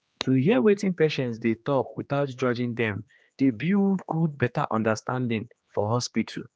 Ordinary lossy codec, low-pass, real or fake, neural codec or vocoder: none; none; fake; codec, 16 kHz, 2 kbps, X-Codec, HuBERT features, trained on general audio